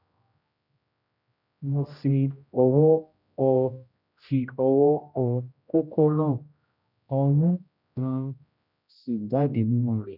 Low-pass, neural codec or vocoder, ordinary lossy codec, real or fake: 5.4 kHz; codec, 16 kHz, 0.5 kbps, X-Codec, HuBERT features, trained on general audio; none; fake